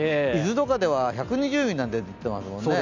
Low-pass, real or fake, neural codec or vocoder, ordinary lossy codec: 7.2 kHz; real; none; none